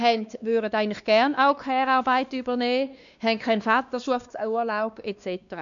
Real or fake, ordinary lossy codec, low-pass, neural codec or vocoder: fake; none; 7.2 kHz; codec, 16 kHz, 2 kbps, X-Codec, WavLM features, trained on Multilingual LibriSpeech